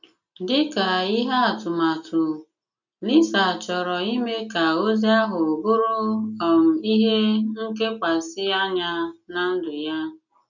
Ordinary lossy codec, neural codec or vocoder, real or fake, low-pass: none; none; real; 7.2 kHz